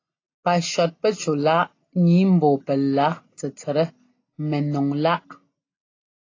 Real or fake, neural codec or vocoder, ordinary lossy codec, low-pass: real; none; AAC, 32 kbps; 7.2 kHz